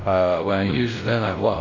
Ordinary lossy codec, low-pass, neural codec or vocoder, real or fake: MP3, 48 kbps; 7.2 kHz; codec, 16 kHz, 0.5 kbps, X-Codec, WavLM features, trained on Multilingual LibriSpeech; fake